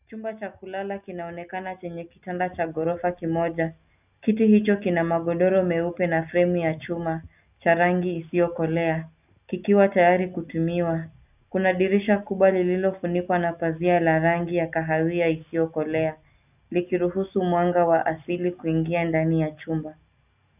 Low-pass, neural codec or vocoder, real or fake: 3.6 kHz; none; real